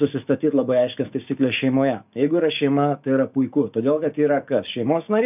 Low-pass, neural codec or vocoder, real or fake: 3.6 kHz; codec, 16 kHz, 6 kbps, DAC; fake